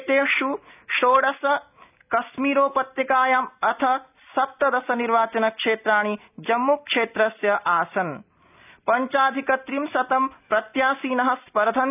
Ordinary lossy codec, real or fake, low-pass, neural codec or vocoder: none; real; 3.6 kHz; none